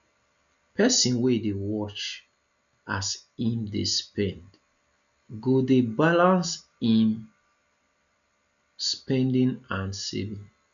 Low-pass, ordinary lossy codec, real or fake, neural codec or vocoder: 7.2 kHz; none; real; none